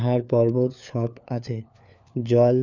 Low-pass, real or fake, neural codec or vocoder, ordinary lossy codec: 7.2 kHz; fake; codec, 16 kHz, 4 kbps, FunCodec, trained on LibriTTS, 50 frames a second; none